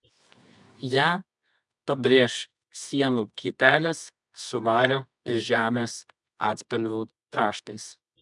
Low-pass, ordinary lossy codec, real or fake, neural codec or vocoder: 10.8 kHz; MP3, 96 kbps; fake; codec, 24 kHz, 0.9 kbps, WavTokenizer, medium music audio release